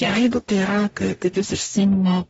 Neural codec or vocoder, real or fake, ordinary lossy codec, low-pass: codec, 44.1 kHz, 0.9 kbps, DAC; fake; AAC, 24 kbps; 19.8 kHz